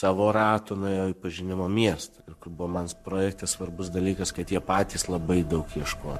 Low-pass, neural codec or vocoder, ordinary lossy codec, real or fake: 14.4 kHz; codec, 44.1 kHz, 7.8 kbps, Pupu-Codec; MP3, 64 kbps; fake